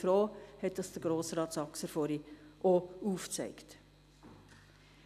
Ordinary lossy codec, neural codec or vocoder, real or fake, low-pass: none; none; real; 14.4 kHz